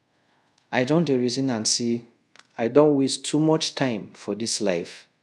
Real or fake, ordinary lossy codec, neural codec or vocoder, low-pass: fake; none; codec, 24 kHz, 0.5 kbps, DualCodec; none